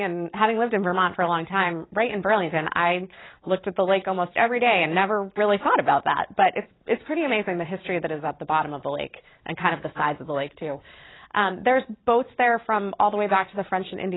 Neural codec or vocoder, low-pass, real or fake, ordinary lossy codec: vocoder, 44.1 kHz, 128 mel bands every 256 samples, BigVGAN v2; 7.2 kHz; fake; AAC, 16 kbps